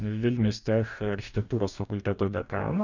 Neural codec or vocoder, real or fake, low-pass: codec, 44.1 kHz, 2.6 kbps, DAC; fake; 7.2 kHz